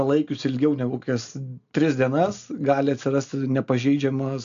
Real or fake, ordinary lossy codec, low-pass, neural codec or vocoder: real; AAC, 64 kbps; 7.2 kHz; none